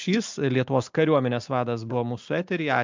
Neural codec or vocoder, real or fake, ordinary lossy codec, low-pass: none; real; MP3, 64 kbps; 7.2 kHz